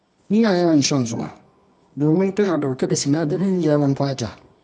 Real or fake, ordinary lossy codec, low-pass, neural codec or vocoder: fake; none; none; codec, 24 kHz, 0.9 kbps, WavTokenizer, medium music audio release